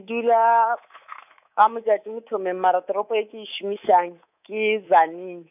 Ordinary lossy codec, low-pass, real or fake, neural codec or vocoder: none; 3.6 kHz; fake; codec, 24 kHz, 3.1 kbps, DualCodec